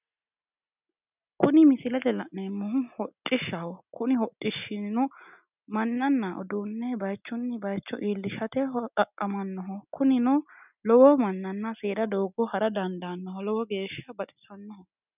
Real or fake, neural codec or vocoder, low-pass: real; none; 3.6 kHz